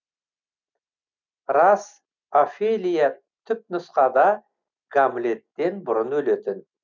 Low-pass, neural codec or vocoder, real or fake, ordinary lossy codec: 7.2 kHz; none; real; none